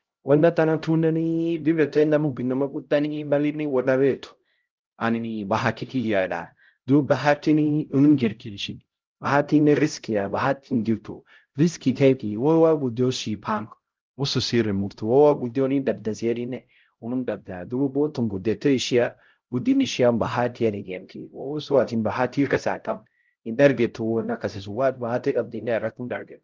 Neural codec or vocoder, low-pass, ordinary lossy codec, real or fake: codec, 16 kHz, 0.5 kbps, X-Codec, HuBERT features, trained on LibriSpeech; 7.2 kHz; Opus, 32 kbps; fake